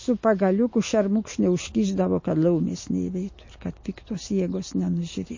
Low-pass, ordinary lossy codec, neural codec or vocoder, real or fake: 7.2 kHz; MP3, 32 kbps; none; real